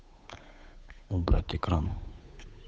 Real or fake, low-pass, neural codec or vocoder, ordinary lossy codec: fake; none; codec, 16 kHz, 8 kbps, FunCodec, trained on Chinese and English, 25 frames a second; none